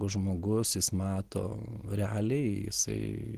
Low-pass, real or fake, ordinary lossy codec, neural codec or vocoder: 14.4 kHz; real; Opus, 16 kbps; none